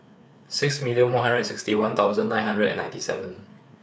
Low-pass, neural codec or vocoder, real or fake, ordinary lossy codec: none; codec, 16 kHz, 4 kbps, FreqCodec, larger model; fake; none